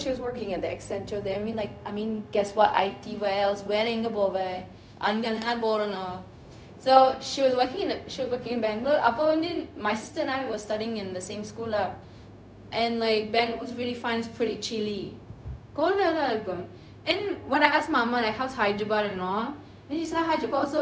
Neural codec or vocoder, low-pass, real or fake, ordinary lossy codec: codec, 16 kHz, 0.4 kbps, LongCat-Audio-Codec; none; fake; none